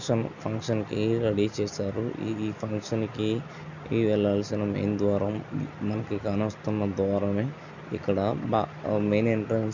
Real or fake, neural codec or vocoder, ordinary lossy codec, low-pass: real; none; none; 7.2 kHz